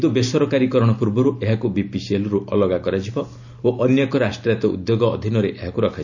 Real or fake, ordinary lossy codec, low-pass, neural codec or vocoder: real; none; 7.2 kHz; none